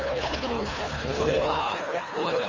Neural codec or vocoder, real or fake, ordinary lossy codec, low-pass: codec, 24 kHz, 3 kbps, HILCodec; fake; Opus, 32 kbps; 7.2 kHz